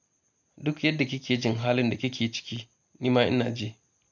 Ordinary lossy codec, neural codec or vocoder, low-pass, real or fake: none; none; 7.2 kHz; real